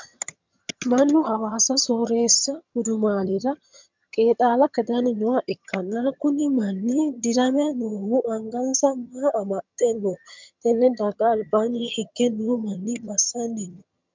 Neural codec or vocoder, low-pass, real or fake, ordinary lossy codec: vocoder, 22.05 kHz, 80 mel bands, HiFi-GAN; 7.2 kHz; fake; MP3, 64 kbps